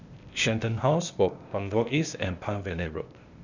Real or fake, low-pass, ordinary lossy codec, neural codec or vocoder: fake; 7.2 kHz; none; codec, 16 kHz, 0.8 kbps, ZipCodec